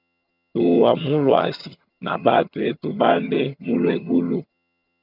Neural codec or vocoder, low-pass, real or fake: vocoder, 22.05 kHz, 80 mel bands, HiFi-GAN; 5.4 kHz; fake